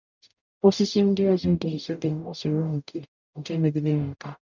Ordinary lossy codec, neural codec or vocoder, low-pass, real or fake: none; codec, 44.1 kHz, 0.9 kbps, DAC; 7.2 kHz; fake